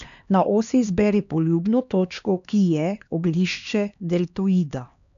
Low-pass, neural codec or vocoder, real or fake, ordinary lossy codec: 7.2 kHz; codec, 16 kHz, 2 kbps, X-Codec, HuBERT features, trained on LibriSpeech; fake; none